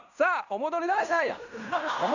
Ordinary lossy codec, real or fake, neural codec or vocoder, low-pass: none; fake; codec, 16 kHz in and 24 kHz out, 0.9 kbps, LongCat-Audio-Codec, fine tuned four codebook decoder; 7.2 kHz